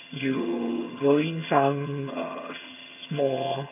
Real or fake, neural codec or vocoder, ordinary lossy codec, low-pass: fake; vocoder, 22.05 kHz, 80 mel bands, HiFi-GAN; none; 3.6 kHz